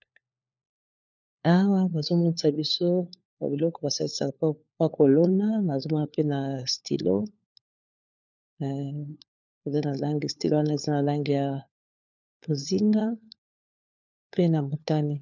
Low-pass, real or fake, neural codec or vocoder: 7.2 kHz; fake; codec, 16 kHz, 4 kbps, FunCodec, trained on LibriTTS, 50 frames a second